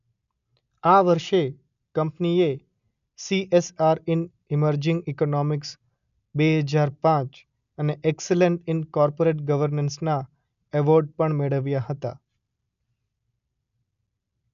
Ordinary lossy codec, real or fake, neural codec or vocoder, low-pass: none; real; none; 7.2 kHz